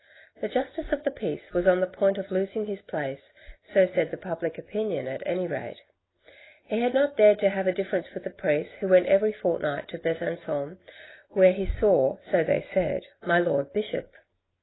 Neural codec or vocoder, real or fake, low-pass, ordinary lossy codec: none; real; 7.2 kHz; AAC, 16 kbps